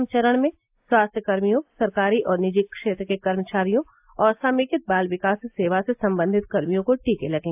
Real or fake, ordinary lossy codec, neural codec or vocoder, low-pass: real; AAC, 32 kbps; none; 3.6 kHz